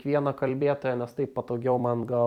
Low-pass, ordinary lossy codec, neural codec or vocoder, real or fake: 19.8 kHz; MP3, 96 kbps; vocoder, 44.1 kHz, 128 mel bands every 256 samples, BigVGAN v2; fake